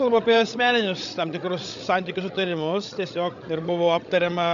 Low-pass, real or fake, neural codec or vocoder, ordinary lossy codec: 7.2 kHz; fake; codec, 16 kHz, 16 kbps, FreqCodec, larger model; AAC, 96 kbps